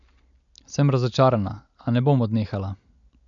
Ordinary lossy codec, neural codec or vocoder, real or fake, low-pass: none; none; real; 7.2 kHz